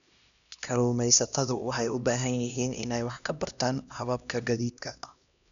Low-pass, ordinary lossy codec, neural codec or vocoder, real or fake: 7.2 kHz; none; codec, 16 kHz, 1 kbps, X-Codec, HuBERT features, trained on LibriSpeech; fake